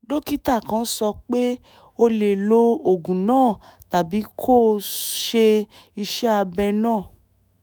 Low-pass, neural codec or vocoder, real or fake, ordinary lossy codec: none; autoencoder, 48 kHz, 128 numbers a frame, DAC-VAE, trained on Japanese speech; fake; none